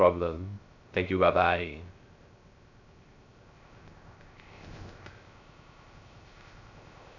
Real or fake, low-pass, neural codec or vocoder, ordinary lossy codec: fake; 7.2 kHz; codec, 16 kHz, 0.3 kbps, FocalCodec; none